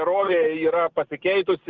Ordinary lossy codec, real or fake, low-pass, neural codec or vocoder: Opus, 32 kbps; real; 7.2 kHz; none